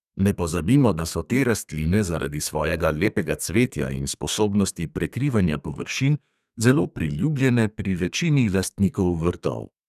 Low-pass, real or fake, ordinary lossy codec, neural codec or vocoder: 14.4 kHz; fake; none; codec, 32 kHz, 1.9 kbps, SNAC